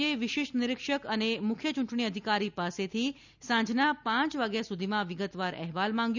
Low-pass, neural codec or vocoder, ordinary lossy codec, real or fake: 7.2 kHz; none; none; real